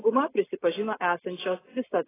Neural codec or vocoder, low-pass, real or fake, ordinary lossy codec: none; 3.6 kHz; real; AAC, 16 kbps